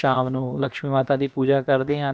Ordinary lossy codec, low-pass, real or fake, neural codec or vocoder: none; none; fake; codec, 16 kHz, about 1 kbps, DyCAST, with the encoder's durations